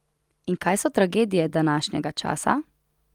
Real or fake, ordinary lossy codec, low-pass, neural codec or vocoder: real; Opus, 32 kbps; 19.8 kHz; none